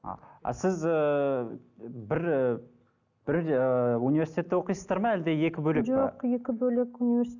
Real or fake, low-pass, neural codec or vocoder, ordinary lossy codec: real; 7.2 kHz; none; none